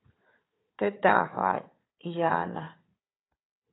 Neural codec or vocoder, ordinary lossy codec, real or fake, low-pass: codec, 16 kHz, 4 kbps, FunCodec, trained on Chinese and English, 50 frames a second; AAC, 16 kbps; fake; 7.2 kHz